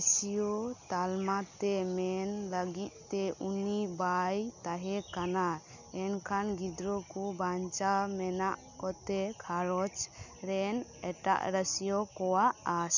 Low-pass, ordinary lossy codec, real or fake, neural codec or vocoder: 7.2 kHz; none; real; none